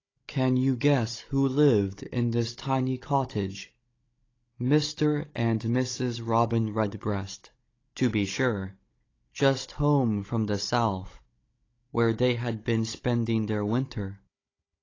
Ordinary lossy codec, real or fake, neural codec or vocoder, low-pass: AAC, 32 kbps; fake; codec, 16 kHz, 16 kbps, FunCodec, trained on Chinese and English, 50 frames a second; 7.2 kHz